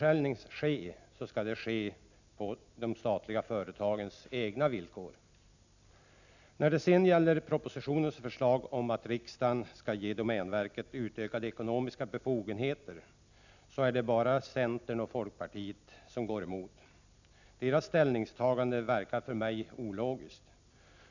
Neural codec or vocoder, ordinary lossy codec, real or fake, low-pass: none; none; real; 7.2 kHz